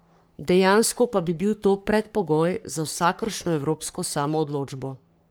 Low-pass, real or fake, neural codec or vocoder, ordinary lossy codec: none; fake; codec, 44.1 kHz, 3.4 kbps, Pupu-Codec; none